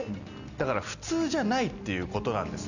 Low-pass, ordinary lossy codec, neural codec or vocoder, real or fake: 7.2 kHz; none; none; real